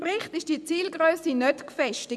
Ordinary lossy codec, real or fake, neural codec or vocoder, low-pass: none; fake; vocoder, 24 kHz, 100 mel bands, Vocos; none